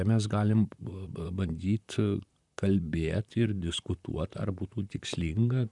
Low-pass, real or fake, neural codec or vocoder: 10.8 kHz; fake; vocoder, 44.1 kHz, 128 mel bands, Pupu-Vocoder